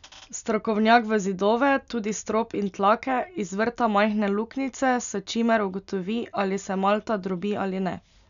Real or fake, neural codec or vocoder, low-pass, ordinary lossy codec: real; none; 7.2 kHz; none